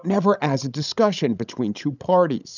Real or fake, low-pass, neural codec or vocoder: fake; 7.2 kHz; codec, 16 kHz, 16 kbps, FreqCodec, larger model